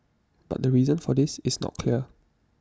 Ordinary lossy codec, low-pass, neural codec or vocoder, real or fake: none; none; none; real